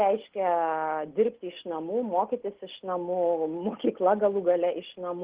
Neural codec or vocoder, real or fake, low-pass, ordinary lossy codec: none; real; 3.6 kHz; Opus, 16 kbps